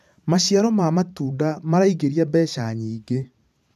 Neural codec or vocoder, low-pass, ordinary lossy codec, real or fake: vocoder, 44.1 kHz, 128 mel bands every 512 samples, BigVGAN v2; 14.4 kHz; none; fake